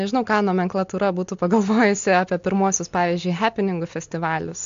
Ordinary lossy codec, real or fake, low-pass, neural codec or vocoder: AAC, 64 kbps; real; 7.2 kHz; none